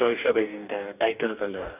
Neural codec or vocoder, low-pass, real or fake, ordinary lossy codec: codec, 44.1 kHz, 2.6 kbps, DAC; 3.6 kHz; fake; none